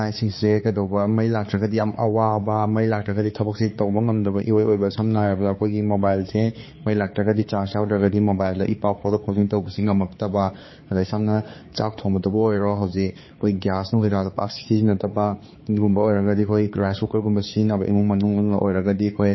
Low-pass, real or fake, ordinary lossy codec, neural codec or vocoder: 7.2 kHz; fake; MP3, 24 kbps; codec, 16 kHz, 4 kbps, X-Codec, HuBERT features, trained on balanced general audio